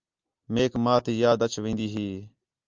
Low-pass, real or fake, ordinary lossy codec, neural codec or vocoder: 7.2 kHz; real; Opus, 24 kbps; none